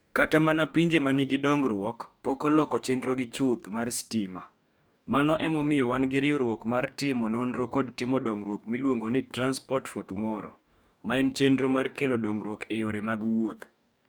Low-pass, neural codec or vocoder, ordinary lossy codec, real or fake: none; codec, 44.1 kHz, 2.6 kbps, DAC; none; fake